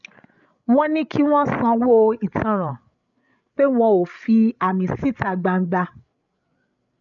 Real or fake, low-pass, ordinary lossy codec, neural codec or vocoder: fake; 7.2 kHz; none; codec, 16 kHz, 8 kbps, FreqCodec, larger model